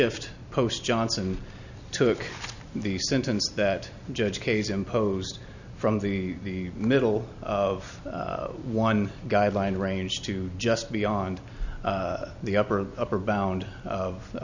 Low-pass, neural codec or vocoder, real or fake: 7.2 kHz; none; real